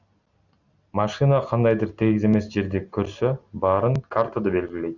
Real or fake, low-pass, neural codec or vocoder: real; 7.2 kHz; none